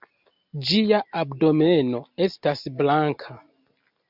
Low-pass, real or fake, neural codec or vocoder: 5.4 kHz; real; none